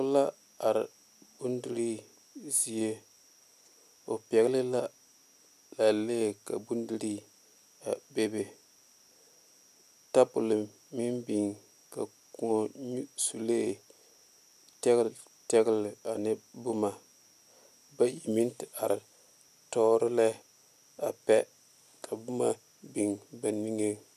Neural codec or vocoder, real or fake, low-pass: none; real; 14.4 kHz